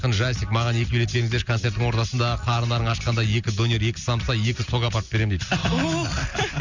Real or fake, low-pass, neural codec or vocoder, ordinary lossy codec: real; 7.2 kHz; none; Opus, 64 kbps